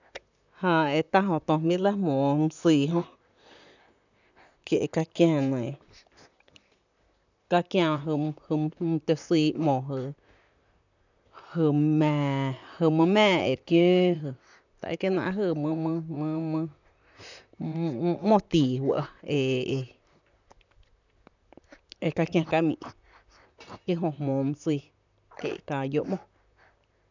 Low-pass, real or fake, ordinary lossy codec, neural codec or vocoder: 7.2 kHz; fake; none; vocoder, 44.1 kHz, 128 mel bands, Pupu-Vocoder